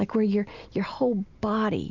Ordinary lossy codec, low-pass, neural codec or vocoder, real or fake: Opus, 64 kbps; 7.2 kHz; none; real